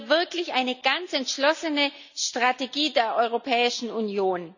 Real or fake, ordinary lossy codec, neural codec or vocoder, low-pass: real; none; none; 7.2 kHz